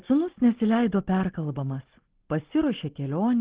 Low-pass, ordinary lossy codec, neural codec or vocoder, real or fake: 3.6 kHz; Opus, 16 kbps; none; real